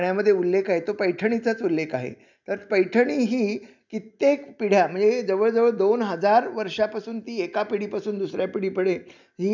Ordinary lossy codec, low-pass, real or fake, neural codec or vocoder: none; 7.2 kHz; real; none